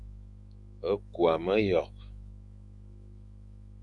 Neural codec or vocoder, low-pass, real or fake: autoencoder, 48 kHz, 128 numbers a frame, DAC-VAE, trained on Japanese speech; 10.8 kHz; fake